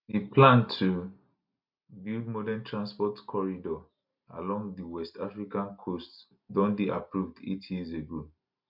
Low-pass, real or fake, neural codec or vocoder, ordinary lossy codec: 5.4 kHz; real; none; MP3, 48 kbps